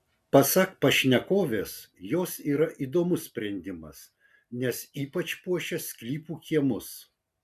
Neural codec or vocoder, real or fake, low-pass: none; real; 14.4 kHz